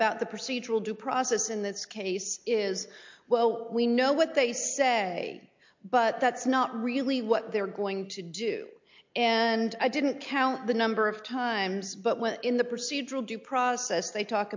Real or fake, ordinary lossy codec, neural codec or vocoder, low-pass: real; MP3, 48 kbps; none; 7.2 kHz